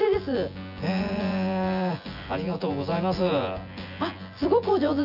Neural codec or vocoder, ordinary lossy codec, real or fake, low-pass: vocoder, 24 kHz, 100 mel bands, Vocos; none; fake; 5.4 kHz